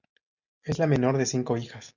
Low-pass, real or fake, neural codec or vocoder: 7.2 kHz; real; none